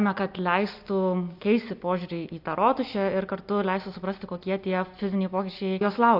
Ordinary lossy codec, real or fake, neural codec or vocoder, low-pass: AAC, 48 kbps; real; none; 5.4 kHz